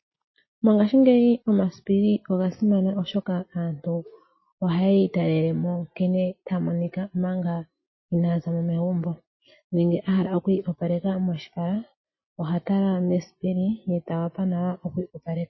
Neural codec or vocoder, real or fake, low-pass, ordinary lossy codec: none; real; 7.2 kHz; MP3, 24 kbps